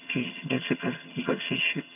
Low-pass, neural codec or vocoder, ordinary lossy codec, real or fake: 3.6 kHz; vocoder, 22.05 kHz, 80 mel bands, HiFi-GAN; none; fake